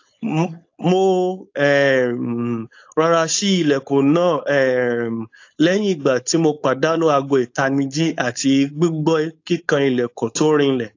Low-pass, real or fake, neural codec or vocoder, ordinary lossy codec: 7.2 kHz; fake; codec, 16 kHz, 4.8 kbps, FACodec; AAC, 48 kbps